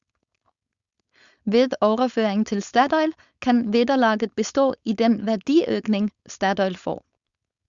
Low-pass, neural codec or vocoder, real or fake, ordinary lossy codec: 7.2 kHz; codec, 16 kHz, 4.8 kbps, FACodec; fake; Opus, 64 kbps